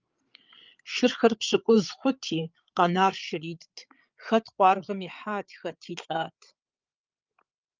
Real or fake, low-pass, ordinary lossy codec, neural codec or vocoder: fake; 7.2 kHz; Opus, 24 kbps; codec, 16 kHz, 8 kbps, FreqCodec, larger model